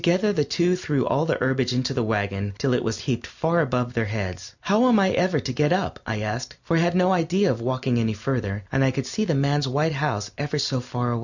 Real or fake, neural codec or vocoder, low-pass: real; none; 7.2 kHz